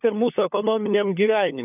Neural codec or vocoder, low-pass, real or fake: codec, 16 kHz, 4 kbps, FunCodec, trained on LibriTTS, 50 frames a second; 3.6 kHz; fake